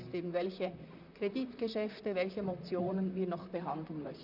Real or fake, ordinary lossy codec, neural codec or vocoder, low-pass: fake; none; vocoder, 44.1 kHz, 128 mel bands, Pupu-Vocoder; 5.4 kHz